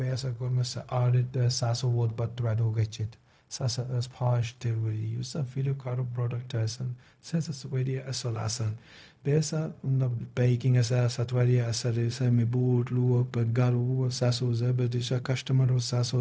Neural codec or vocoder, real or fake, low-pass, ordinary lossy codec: codec, 16 kHz, 0.4 kbps, LongCat-Audio-Codec; fake; none; none